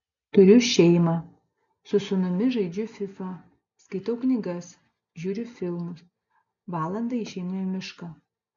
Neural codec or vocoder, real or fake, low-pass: none; real; 7.2 kHz